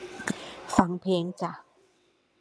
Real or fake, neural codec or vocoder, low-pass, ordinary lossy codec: fake; vocoder, 22.05 kHz, 80 mel bands, WaveNeXt; none; none